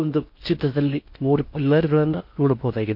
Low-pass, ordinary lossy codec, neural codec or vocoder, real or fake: 5.4 kHz; MP3, 32 kbps; codec, 16 kHz in and 24 kHz out, 0.6 kbps, FocalCodec, streaming, 4096 codes; fake